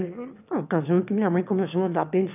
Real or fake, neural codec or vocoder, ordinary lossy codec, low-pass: fake; autoencoder, 22.05 kHz, a latent of 192 numbers a frame, VITS, trained on one speaker; none; 3.6 kHz